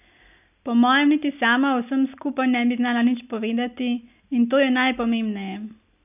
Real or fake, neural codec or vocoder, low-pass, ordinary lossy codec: real; none; 3.6 kHz; none